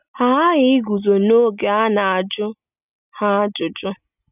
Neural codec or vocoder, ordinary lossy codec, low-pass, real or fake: none; none; 3.6 kHz; real